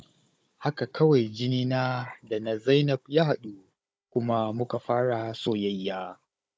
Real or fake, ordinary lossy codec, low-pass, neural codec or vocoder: fake; none; none; codec, 16 kHz, 16 kbps, FunCodec, trained on Chinese and English, 50 frames a second